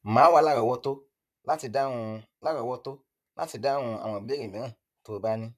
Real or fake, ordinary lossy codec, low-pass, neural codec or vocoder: fake; none; 14.4 kHz; vocoder, 44.1 kHz, 128 mel bands, Pupu-Vocoder